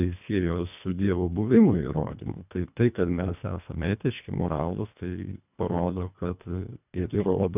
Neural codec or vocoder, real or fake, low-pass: codec, 24 kHz, 1.5 kbps, HILCodec; fake; 3.6 kHz